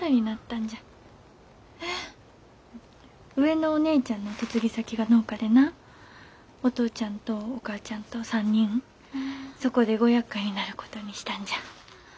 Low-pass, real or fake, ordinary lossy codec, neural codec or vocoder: none; real; none; none